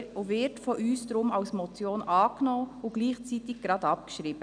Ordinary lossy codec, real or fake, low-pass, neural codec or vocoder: none; real; 9.9 kHz; none